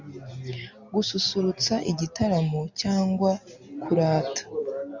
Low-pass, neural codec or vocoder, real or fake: 7.2 kHz; none; real